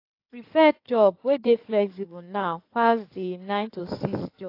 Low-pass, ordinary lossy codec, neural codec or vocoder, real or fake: 5.4 kHz; AAC, 32 kbps; codec, 16 kHz in and 24 kHz out, 2.2 kbps, FireRedTTS-2 codec; fake